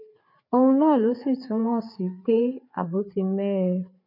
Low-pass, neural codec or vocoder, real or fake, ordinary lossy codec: 5.4 kHz; codec, 16 kHz, 4 kbps, FreqCodec, larger model; fake; none